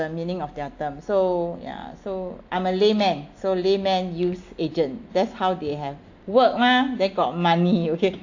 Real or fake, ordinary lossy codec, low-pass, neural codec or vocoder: real; AAC, 48 kbps; 7.2 kHz; none